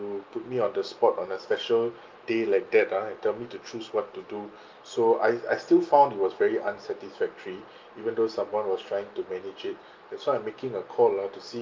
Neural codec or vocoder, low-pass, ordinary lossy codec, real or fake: none; 7.2 kHz; Opus, 32 kbps; real